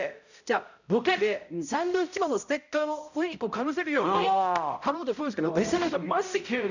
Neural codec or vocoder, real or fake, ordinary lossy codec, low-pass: codec, 16 kHz, 0.5 kbps, X-Codec, HuBERT features, trained on balanced general audio; fake; none; 7.2 kHz